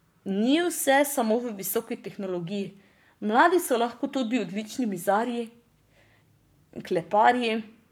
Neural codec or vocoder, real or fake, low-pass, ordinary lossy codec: codec, 44.1 kHz, 7.8 kbps, Pupu-Codec; fake; none; none